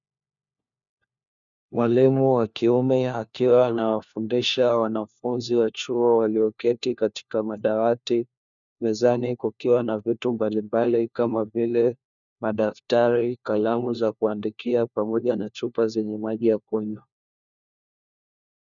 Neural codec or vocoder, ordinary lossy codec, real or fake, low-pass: codec, 16 kHz, 1 kbps, FunCodec, trained on LibriTTS, 50 frames a second; MP3, 96 kbps; fake; 7.2 kHz